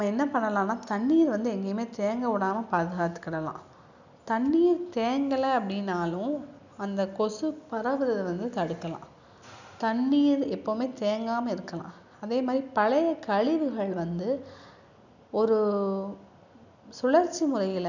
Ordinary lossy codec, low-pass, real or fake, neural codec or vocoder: none; 7.2 kHz; real; none